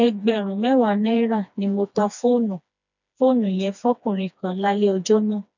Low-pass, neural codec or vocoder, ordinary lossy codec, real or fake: 7.2 kHz; codec, 16 kHz, 2 kbps, FreqCodec, smaller model; none; fake